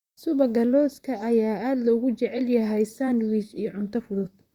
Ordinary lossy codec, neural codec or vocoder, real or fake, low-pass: Opus, 64 kbps; vocoder, 44.1 kHz, 128 mel bands, Pupu-Vocoder; fake; 19.8 kHz